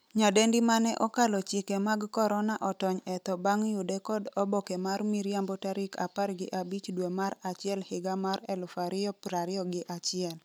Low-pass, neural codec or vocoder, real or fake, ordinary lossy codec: none; none; real; none